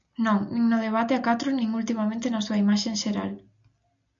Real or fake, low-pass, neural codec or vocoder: real; 7.2 kHz; none